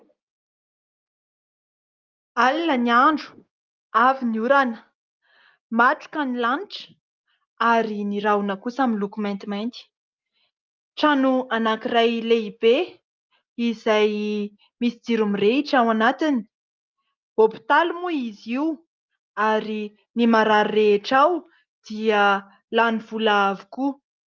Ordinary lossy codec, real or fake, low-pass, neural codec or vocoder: Opus, 32 kbps; real; 7.2 kHz; none